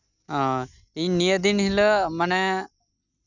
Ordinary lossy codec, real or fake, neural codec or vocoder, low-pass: none; real; none; 7.2 kHz